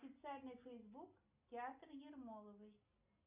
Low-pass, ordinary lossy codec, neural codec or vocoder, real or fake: 3.6 kHz; MP3, 32 kbps; none; real